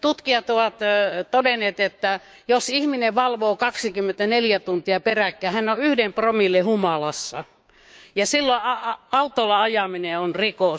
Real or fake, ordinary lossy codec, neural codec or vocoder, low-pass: fake; none; codec, 16 kHz, 6 kbps, DAC; none